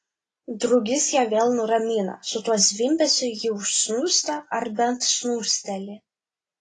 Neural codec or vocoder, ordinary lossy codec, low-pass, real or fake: none; AAC, 32 kbps; 10.8 kHz; real